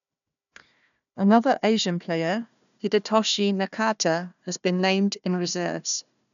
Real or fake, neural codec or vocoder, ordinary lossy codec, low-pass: fake; codec, 16 kHz, 1 kbps, FunCodec, trained on Chinese and English, 50 frames a second; none; 7.2 kHz